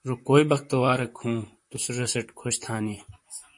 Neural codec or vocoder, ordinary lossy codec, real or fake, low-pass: vocoder, 44.1 kHz, 128 mel bands, Pupu-Vocoder; MP3, 48 kbps; fake; 10.8 kHz